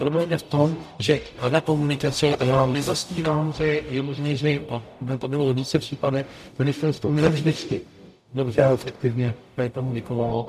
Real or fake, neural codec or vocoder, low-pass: fake; codec, 44.1 kHz, 0.9 kbps, DAC; 14.4 kHz